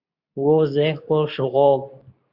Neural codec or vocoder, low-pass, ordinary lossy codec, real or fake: codec, 24 kHz, 0.9 kbps, WavTokenizer, medium speech release version 2; 5.4 kHz; AAC, 48 kbps; fake